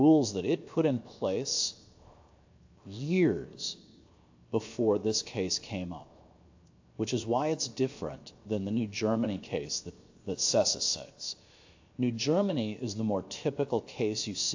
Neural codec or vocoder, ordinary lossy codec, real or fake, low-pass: codec, 16 kHz, 0.7 kbps, FocalCodec; AAC, 48 kbps; fake; 7.2 kHz